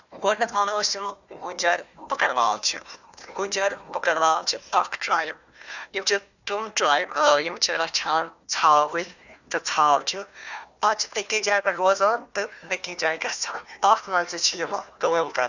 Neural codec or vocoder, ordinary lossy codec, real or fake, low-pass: codec, 16 kHz, 1 kbps, FunCodec, trained on Chinese and English, 50 frames a second; none; fake; 7.2 kHz